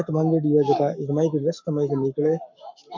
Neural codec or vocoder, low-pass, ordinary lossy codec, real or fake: codec, 44.1 kHz, 7.8 kbps, Pupu-Codec; 7.2 kHz; MP3, 48 kbps; fake